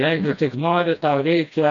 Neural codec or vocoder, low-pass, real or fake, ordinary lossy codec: codec, 16 kHz, 1 kbps, FreqCodec, smaller model; 7.2 kHz; fake; MP3, 64 kbps